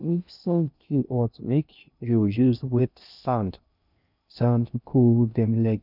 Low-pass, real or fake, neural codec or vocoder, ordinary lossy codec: 5.4 kHz; fake; codec, 16 kHz in and 24 kHz out, 0.6 kbps, FocalCodec, streaming, 2048 codes; none